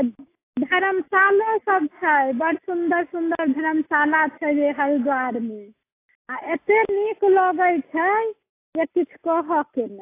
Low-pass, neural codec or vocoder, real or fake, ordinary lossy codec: 3.6 kHz; none; real; AAC, 24 kbps